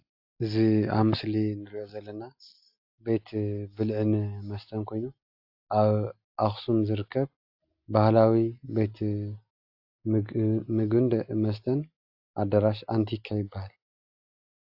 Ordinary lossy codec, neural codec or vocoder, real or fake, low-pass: AAC, 48 kbps; none; real; 5.4 kHz